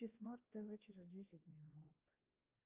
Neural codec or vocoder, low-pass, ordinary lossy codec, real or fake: codec, 16 kHz, 2 kbps, X-Codec, WavLM features, trained on Multilingual LibriSpeech; 3.6 kHz; Opus, 32 kbps; fake